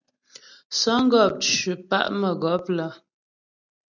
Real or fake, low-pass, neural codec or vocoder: real; 7.2 kHz; none